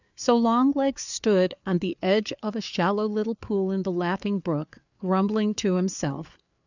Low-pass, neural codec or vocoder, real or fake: 7.2 kHz; codec, 16 kHz, 4 kbps, FreqCodec, larger model; fake